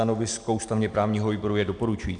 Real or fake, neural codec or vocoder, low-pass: real; none; 9.9 kHz